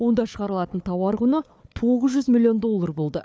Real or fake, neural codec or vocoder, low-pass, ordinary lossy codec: fake; codec, 16 kHz, 4 kbps, FunCodec, trained on Chinese and English, 50 frames a second; none; none